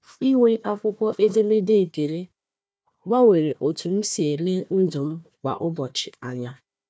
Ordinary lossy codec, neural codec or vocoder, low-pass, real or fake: none; codec, 16 kHz, 1 kbps, FunCodec, trained on Chinese and English, 50 frames a second; none; fake